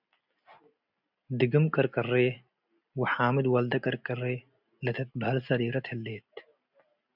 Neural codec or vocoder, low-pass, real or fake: none; 5.4 kHz; real